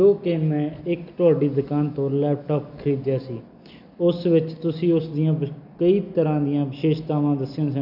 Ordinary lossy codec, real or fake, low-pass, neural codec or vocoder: none; real; 5.4 kHz; none